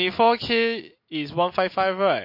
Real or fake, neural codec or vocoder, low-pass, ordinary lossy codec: real; none; 5.4 kHz; MP3, 32 kbps